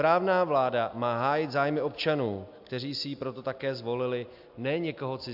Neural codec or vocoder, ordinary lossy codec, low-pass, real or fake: none; AAC, 48 kbps; 5.4 kHz; real